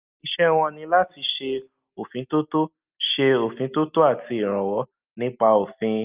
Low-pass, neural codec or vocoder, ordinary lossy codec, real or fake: 3.6 kHz; none; Opus, 24 kbps; real